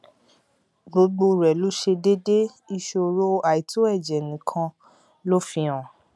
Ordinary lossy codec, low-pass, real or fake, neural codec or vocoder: none; none; real; none